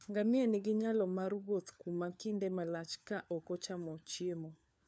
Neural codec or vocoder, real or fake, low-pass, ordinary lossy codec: codec, 16 kHz, 4 kbps, FunCodec, trained on LibriTTS, 50 frames a second; fake; none; none